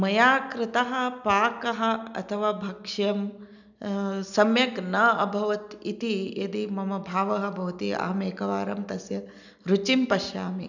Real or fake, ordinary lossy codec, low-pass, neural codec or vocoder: real; none; 7.2 kHz; none